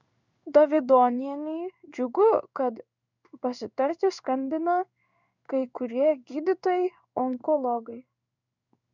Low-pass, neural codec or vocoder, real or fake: 7.2 kHz; codec, 16 kHz in and 24 kHz out, 1 kbps, XY-Tokenizer; fake